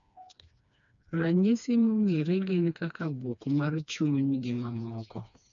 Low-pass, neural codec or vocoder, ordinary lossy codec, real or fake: 7.2 kHz; codec, 16 kHz, 2 kbps, FreqCodec, smaller model; none; fake